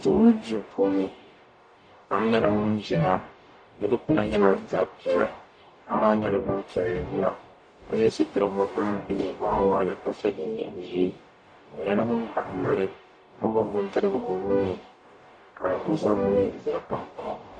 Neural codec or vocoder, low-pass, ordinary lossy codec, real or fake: codec, 44.1 kHz, 0.9 kbps, DAC; 9.9 kHz; AAC, 48 kbps; fake